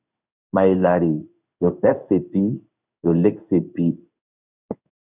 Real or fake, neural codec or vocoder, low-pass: fake; codec, 16 kHz in and 24 kHz out, 1 kbps, XY-Tokenizer; 3.6 kHz